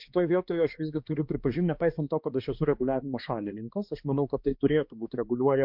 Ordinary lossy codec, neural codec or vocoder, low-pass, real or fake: MP3, 48 kbps; codec, 16 kHz, 2 kbps, X-Codec, HuBERT features, trained on balanced general audio; 5.4 kHz; fake